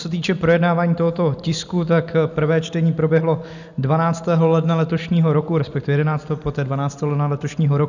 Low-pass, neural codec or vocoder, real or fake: 7.2 kHz; none; real